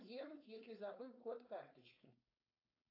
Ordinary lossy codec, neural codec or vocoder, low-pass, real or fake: AAC, 24 kbps; codec, 16 kHz, 4.8 kbps, FACodec; 5.4 kHz; fake